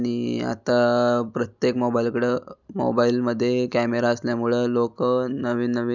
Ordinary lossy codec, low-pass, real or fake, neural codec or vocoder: none; 7.2 kHz; real; none